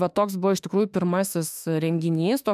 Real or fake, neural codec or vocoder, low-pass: fake; autoencoder, 48 kHz, 32 numbers a frame, DAC-VAE, trained on Japanese speech; 14.4 kHz